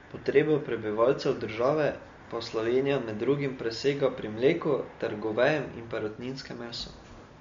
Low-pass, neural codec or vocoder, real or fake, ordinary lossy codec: 7.2 kHz; none; real; MP3, 48 kbps